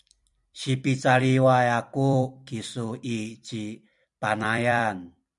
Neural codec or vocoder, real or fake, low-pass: vocoder, 44.1 kHz, 128 mel bands every 256 samples, BigVGAN v2; fake; 10.8 kHz